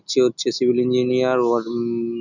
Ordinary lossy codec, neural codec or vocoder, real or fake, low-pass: none; none; real; 7.2 kHz